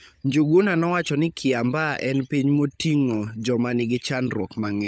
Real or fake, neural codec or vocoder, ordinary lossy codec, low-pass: fake; codec, 16 kHz, 16 kbps, FunCodec, trained on LibriTTS, 50 frames a second; none; none